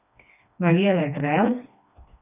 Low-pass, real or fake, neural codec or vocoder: 3.6 kHz; fake; codec, 16 kHz, 2 kbps, FreqCodec, smaller model